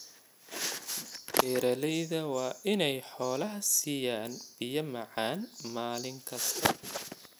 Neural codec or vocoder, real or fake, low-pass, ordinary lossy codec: none; real; none; none